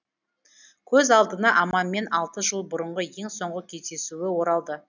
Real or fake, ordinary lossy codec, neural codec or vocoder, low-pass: real; none; none; 7.2 kHz